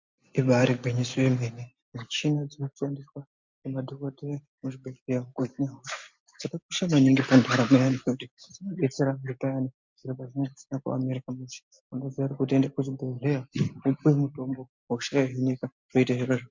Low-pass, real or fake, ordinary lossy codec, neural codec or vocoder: 7.2 kHz; real; MP3, 64 kbps; none